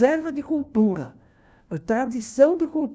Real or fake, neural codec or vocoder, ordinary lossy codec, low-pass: fake; codec, 16 kHz, 1 kbps, FunCodec, trained on LibriTTS, 50 frames a second; none; none